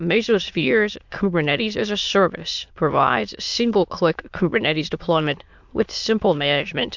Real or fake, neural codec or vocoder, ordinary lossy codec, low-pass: fake; autoencoder, 22.05 kHz, a latent of 192 numbers a frame, VITS, trained on many speakers; MP3, 64 kbps; 7.2 kHz